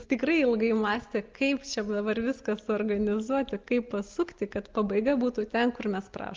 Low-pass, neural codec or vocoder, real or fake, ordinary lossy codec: 7.2 kHz; none; real; Opus, 24 kbps